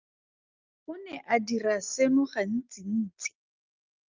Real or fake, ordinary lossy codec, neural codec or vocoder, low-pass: real; Opus, 24 kbps; none; 7.2 kHz